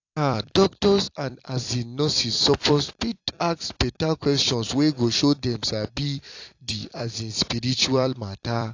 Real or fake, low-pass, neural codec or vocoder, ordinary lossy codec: fake; 7.2 kHz; vocoder, 44.1 kHz, 128 mel bands every 256 samples, BigVGAN v2; AAC, 48 kbps